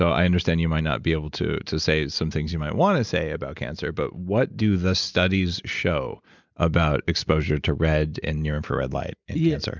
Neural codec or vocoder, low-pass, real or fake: none; 7.2 kHz; real